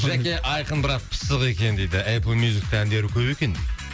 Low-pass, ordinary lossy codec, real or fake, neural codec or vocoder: none; none; real; none